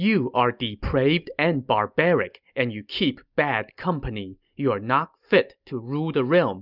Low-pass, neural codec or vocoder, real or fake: 5.4 kHz; none; real